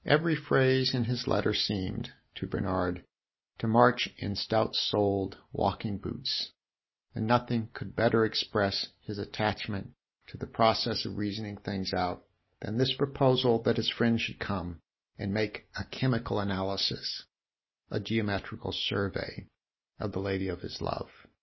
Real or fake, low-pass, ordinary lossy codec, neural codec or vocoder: real; 7.2 kHz; MP3, 24 kbps; none